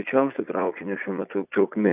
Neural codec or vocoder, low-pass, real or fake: vocoder, 44.1 kHz, 80 mel bands, Vocos; 3.6 kHz; fake